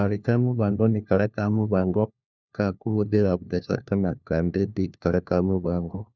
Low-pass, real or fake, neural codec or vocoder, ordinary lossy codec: 7.2 kHz; fake; codec, 16 kHz, 1 kbps, FunCodec, trained on LibriTTS, 50 frames a second; none